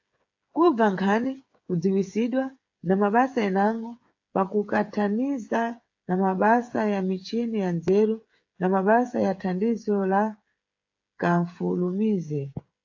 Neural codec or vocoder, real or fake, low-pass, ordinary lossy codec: codec, 16 kHz, 8 kbps, FreqCodec, smaller model; fake; 7.2 kHz; AAC, 48 kbps